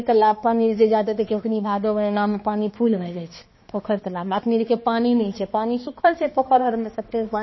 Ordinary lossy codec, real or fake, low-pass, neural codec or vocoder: MP3, 24 kbps; fake; 7.2 kHz; codec, 16 kHz, 2 kbps, X-Codec, HuBERT features, trained on balanced general audio